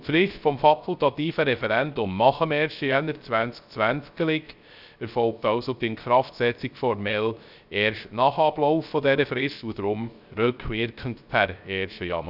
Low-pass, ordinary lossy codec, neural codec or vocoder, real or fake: 5.4 kHz; none; codec, 16 kHz, 0.3 kbps, FocalCodec; fake